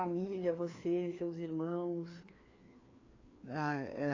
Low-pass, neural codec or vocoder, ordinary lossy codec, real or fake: 7.2 kHz; codec, 16 kHz, 2 kbps, FreqCodec, larger model; AAC, 32 kbps; fake